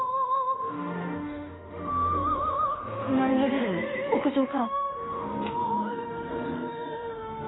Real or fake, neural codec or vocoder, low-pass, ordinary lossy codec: fake; autoencoder, 48 kHz, 32 numbers a frame, DAC-VAE, trained on Japanese speech; 7.2 kHz; AAC, 16 kbps